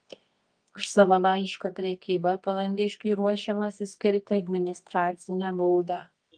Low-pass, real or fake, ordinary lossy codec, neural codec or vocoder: 9.9 kHz; fake; Opus, 32 kbps; codec, 24 kHz, 0.9 kbps, WavTokenizer, medium music audio release